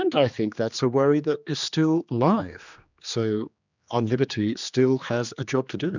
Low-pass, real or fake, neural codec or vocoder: 7.2 kHz; fake; codec, 16 kHz, 2 kbps, X-Codec, HuBERT features, trained on general audio